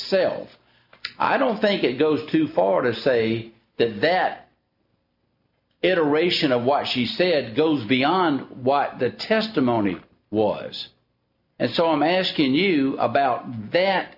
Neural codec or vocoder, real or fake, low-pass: none; real; 5.4 kHz